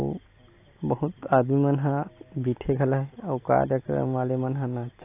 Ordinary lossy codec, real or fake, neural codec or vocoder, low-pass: AAC, 24 kbps; real; none; 3.6 kHz